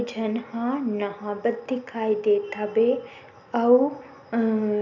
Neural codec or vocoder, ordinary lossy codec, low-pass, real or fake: none; none; 7.2 kHz; real